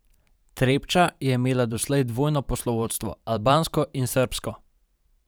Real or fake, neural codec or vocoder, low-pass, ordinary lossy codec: fake; vocoder, 44.1 kHz, 128 mel bands every 512 samples, BigVGAN v2; none; none